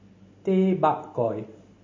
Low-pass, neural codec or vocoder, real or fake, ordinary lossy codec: 7.2 kHz; none; real; MP3, 32 kbps